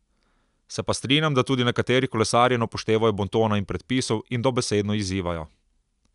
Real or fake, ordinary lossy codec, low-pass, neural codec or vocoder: real; none; 10.8 kHz; none